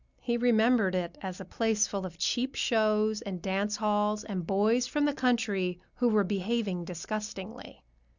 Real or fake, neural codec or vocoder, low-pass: real; none; 7.2 kHz